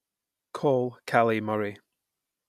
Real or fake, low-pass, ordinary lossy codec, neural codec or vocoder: real; 14.4 kHz; none; none